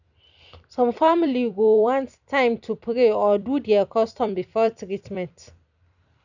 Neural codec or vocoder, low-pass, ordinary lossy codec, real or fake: vocoder, 44.1 kHz, 128 mel bands every 256 samples, BigVGAN v2; 7.2 kHz; none; fake